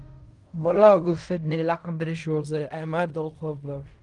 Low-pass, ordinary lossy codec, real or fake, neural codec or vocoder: 10.8 kHz; Opus, 32 kbps; fake; codec, 16 kHz in and 24 kHz out, 0.4 kbps, LongCat-Audio-Codec, fine tuned four codebook decoder